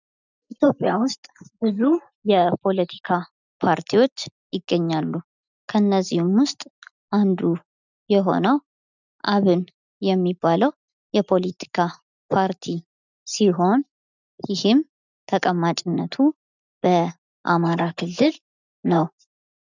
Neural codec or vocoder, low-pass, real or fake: none; 7.2 kHz; real